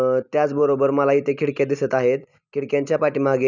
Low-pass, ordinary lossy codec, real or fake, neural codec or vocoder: 7.2 kHz; none; real; none